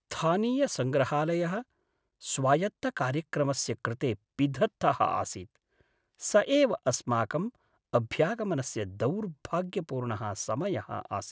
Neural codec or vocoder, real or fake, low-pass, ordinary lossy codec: none; real; none; none